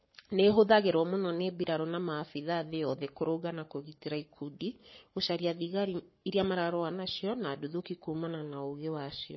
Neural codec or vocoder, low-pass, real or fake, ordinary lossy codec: codec, 44.1 kHz, 7.8 kbps, Pupu-Codec; 7.2 kHz; fake; MP3, 24 kbps